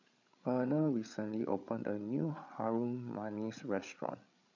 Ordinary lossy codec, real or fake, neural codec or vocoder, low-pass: none; fake; codec, 16 kHz, 8 kbps, FreqCodec, larger model; 7.2 kHz